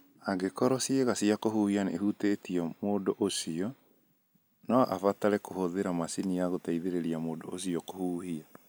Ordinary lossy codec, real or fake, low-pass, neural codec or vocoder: none; real; none; none